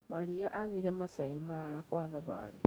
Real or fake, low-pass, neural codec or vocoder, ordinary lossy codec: fake; none; codec, 44.1 kHz, 2.6 kbps, DAC; none